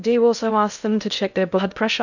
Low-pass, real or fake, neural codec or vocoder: 7.2 kHz; fake; codec, 16 kHz in and 24 kHz out, 0.6 kbps, FocalCodec, streaming, 2048 codes